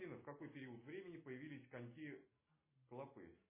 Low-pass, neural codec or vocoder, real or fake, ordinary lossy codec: 3.6 kHz; none; real; MP3, 16 kbps